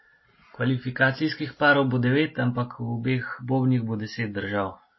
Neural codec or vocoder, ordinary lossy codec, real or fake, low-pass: none; MP3, 24 kbps; real; 7.2 kHz